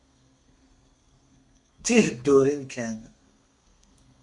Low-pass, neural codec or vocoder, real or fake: 10.8 kHz; codec, 32 kHz, 1.9 kbps, SNAC; fake